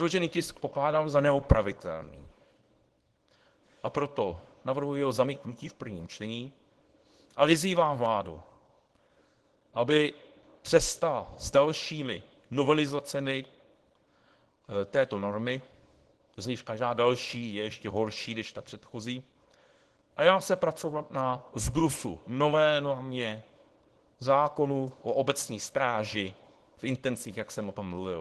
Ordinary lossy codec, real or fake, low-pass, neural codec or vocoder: Opus, 16 kbps; fake; 10.8 kHz; codec, 24 kHz, 0.9 kbps, WavTokenizer, small release